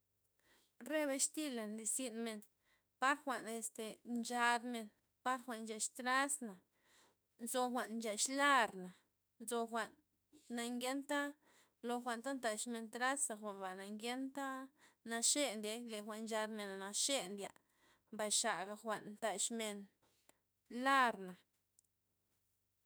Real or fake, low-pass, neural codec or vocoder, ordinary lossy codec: fake; none; autoencoder, 48 kHz, 32 numbers a frame, DAC-VAE, trained on Japanese speech; none